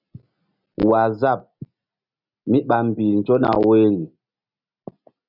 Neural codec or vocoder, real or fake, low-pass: none; real; 5.4 kHz